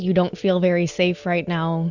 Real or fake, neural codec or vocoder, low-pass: real; none; 7.2 kHz